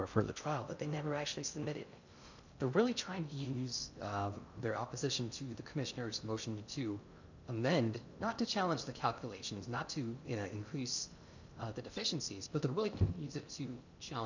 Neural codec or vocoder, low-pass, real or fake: codec, 16 kHz in and 24 kHz out, 0.6 kbps, FocalCodec, streaming, 2048 codes; 7.2 kHz; fake